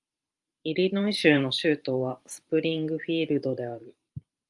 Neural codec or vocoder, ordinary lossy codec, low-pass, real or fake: vocoder, 44.1 kHz, 128 mel bands every 512 samples, BigVGAN v2; Opus, 32 kbps; 10.8 kHz; fake